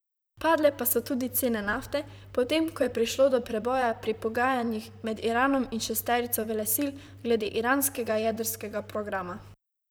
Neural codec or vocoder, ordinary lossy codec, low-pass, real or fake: vocoder, 44.1 kHz, 128 mel bands, Pupu-Vocoder; none; none; fake